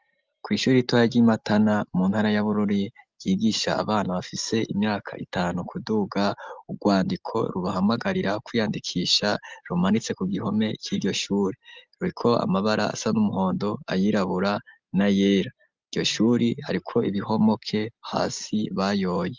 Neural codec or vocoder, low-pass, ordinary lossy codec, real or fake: none; 7.2 kHz; Opus, 24 kbps; real